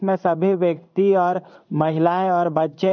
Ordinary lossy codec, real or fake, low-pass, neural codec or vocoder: none; fake; 7.2 kHz; codec, 16 kHz in and 24 kHz out, 1 kbps, XY-Tokenizer